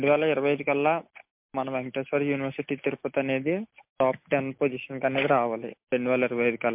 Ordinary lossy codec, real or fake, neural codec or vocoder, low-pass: MP3, 32 kbps; real; none; 3.6 kHz